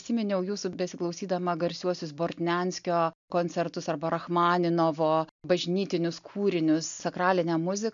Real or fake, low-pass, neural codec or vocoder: real; 7.2 kHz; none